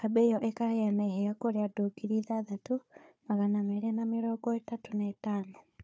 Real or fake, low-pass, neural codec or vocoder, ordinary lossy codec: fake; none; codec, 16 kHz, 4 kbps, FunCodec, trained on Chinese and English, 50 frames a second; none